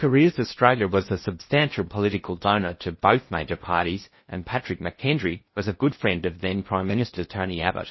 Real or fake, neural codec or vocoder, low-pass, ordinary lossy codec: fake; codec, 16 kHz in and 24 kHz out, 0.6 kbps, FocalCodec, streaming, 2048 codes; 7.2 kHz; MP3, 24 kbps